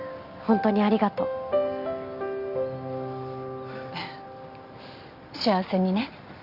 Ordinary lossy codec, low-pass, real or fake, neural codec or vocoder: Opus, 64 kbps; 5.4 kHz; real; none